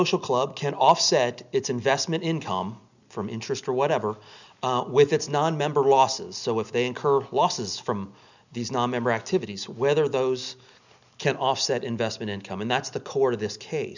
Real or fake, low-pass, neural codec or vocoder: real; 7.2 kHz; none